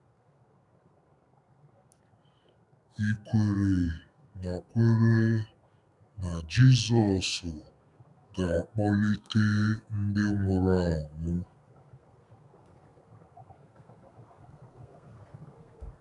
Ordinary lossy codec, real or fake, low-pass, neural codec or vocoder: none; fake; 10.8 kHz; codec, 32 kHz, 1.9 kbps, SNAC